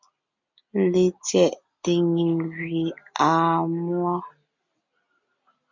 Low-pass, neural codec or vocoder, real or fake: 7.2 kHz; none; real